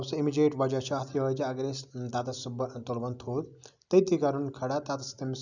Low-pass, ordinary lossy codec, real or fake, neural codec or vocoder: 7.2 kHz; none; real; none